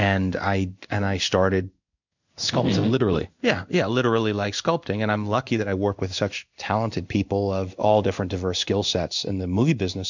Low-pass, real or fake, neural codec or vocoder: 7.2 kHz; fake; codec, 16 kHz in and 24 kHz out, 1 kbps, XY-Tokenizer